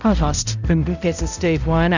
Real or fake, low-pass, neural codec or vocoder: fake; 7.2 kHz; codec, 16 kHz, 0.5 kbps, X-Codec, HuBERT features, trained on balanced general audio